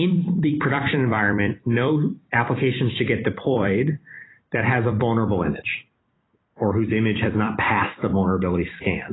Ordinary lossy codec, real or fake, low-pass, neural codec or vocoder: AAC, 16 kbps; fake; 7.2 kHz; vocoder, 44.1 kHz, 128 mel bands every 256 samples, BigVGAN v2